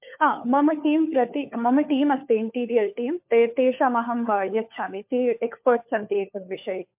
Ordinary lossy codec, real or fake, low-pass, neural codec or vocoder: MP3, 32 kbps; fake; 3.6 kHz; codec, 16 kHz, 4 kbps, FunCodec, trained on LibriTTS, 50 frames a second